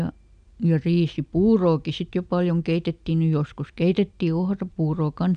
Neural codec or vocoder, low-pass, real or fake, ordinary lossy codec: none; 9.9 kHz; real; none